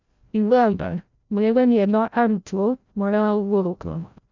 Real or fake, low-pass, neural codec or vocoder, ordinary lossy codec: fake; 7.2 kHz; codec, 16 kHz, 0.5 kbps, FreqCodec, larger model; none